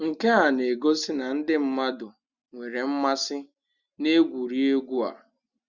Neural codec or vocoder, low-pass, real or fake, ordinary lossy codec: none; 7.2 kHz; real; Opus, 64 kbps